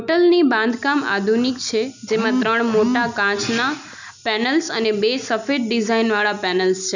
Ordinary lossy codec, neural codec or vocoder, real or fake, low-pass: none; none; real; 7.2 kHz